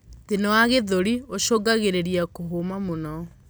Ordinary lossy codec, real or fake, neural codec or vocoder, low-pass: none; real; none; none